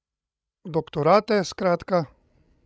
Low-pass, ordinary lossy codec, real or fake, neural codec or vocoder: none; none; fake; codec, 16 kHz, 16 kbps, FreqCodec, larger model